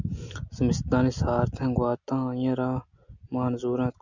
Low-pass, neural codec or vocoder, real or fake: 7.2 kHz; none; real